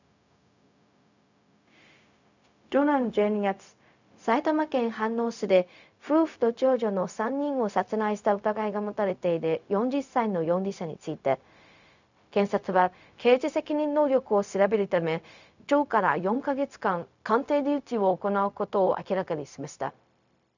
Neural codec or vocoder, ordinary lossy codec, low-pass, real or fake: codec, 16 kHz, 0.4 kbps, LongCat-Audio-Codec; none; 7.2 kHz; fake